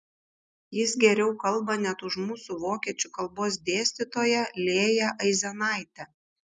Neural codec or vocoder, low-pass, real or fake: none; 10.8 kHz; real